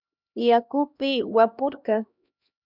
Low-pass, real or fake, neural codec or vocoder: 5.4 kHz; fake; codec, 16 kHz, 1 kbps, X-Codec, HuBERT features, trained on LibriSpeech